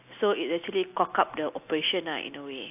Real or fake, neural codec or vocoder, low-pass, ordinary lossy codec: real; none; 3.6 kHz; AAC, 32 kbps